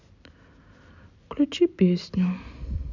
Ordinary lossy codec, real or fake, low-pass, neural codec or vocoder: none; real; 7.2 kHz; none